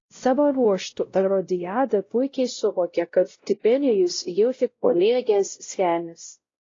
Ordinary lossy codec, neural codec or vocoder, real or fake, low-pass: AAC, 32 kbps; codec, 16 kHz, 0.5 kbps, X-Codec, WavLM features, trained on Multilingual LibriSpeech; fake; 7.2 kHz